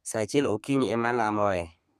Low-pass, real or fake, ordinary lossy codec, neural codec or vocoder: 14.4 kHz; fake; none; codec, 32 kHz, 1.9 kbps, SNAC